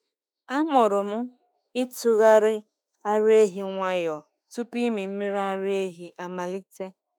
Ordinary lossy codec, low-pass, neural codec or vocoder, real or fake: none; 19.8 kHz; autoencoder, 48 kHz, 32 numbers a frame, DAC-VAE, trained on Japanese speech; fake